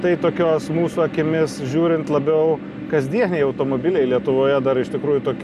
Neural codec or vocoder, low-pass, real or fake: none; 14.4 kHz; real